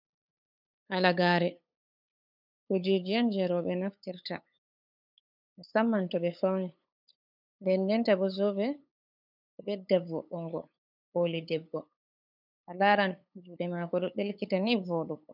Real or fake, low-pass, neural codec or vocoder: fake; 5.4 kHz; codec, 16 kHz, 8 kbps, FunCodec, trained on LibriTTS, 25 frames a second